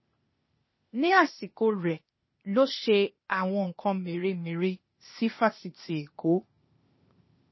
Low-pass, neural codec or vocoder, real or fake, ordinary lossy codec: 7.2 kHz; codec, 16 kHz, 0.8 kbps, ZipCodec; fake; MP3, 24 kbps